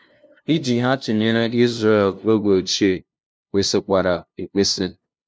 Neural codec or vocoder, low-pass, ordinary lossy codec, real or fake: codec, 16 kHz, 0.5 kbps, FunCodec, trained on LibriTTS, 25 frames a second; none; none; fake